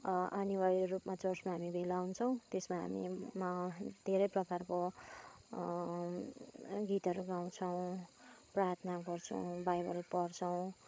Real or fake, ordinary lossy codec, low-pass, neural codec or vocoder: fake; none; none; codec, 16 kHz, 8 kbps, FreqCodec, larger model